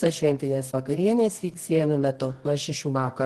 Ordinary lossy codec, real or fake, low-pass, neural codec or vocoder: Opus, 16 kbps; fake; 10.8 kHz; codec, 24 kHz, 0.9 kbps, WavTokenizer, medium music audio release